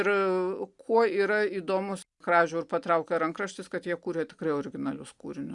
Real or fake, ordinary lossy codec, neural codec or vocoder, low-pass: real; Opus, 64 kbps; none; 10.8 kHz